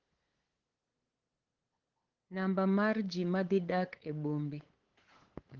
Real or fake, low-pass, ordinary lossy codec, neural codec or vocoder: fake; 7.2 kHz; Opus, 16 kbps; codec, 16 kHz, 8 kbps, FunCodec, trained on LibriTTS, 25 frames a second